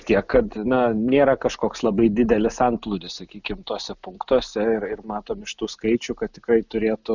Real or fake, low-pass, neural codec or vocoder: real; 7.2 kHz; none